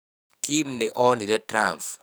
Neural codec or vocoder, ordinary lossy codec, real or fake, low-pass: codec, 44.1 kHz, 2.6 kbps, SNAC; none; fake; none